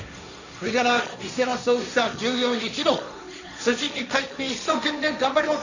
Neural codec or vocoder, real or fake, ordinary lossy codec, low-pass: codec, 16 kHz, 1.1 kbps, Voila-Tokenizer; fake; none; 7.2 kHz